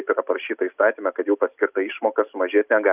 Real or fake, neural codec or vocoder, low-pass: real; none; 3.6 kHz